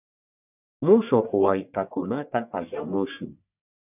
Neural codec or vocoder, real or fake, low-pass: codec, 44.1 kHz, 1.7 kbps, Pupu-Codec; fake; 3.6 kHz